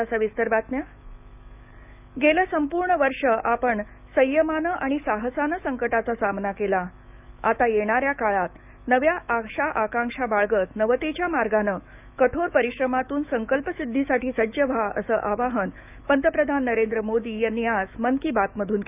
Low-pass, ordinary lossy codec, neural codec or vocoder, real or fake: 3.6 kHz; none; autoencoder, 48 kHz, 128 numbers a frame, DAC-VAE, trained on Japanese speech; fake